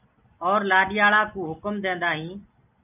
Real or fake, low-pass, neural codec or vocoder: real; 3.6 kHz; none